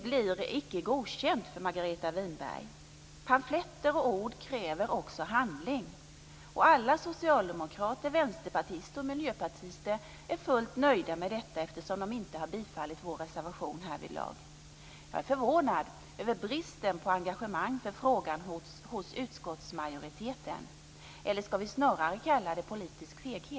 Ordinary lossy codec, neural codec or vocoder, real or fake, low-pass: none; none; real; none